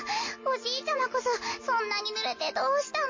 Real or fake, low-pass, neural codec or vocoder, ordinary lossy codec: real; 7.2 kHz; none; MP3, 32 kbps